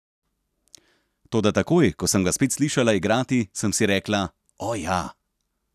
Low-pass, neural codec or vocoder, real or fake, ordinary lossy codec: 14.4 kHz; none; real; none